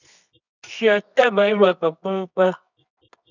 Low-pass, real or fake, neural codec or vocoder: 7.2 kHz; fake; codec, 24 kHz, 0.9 kbps, WavTokenizer, medium music audio release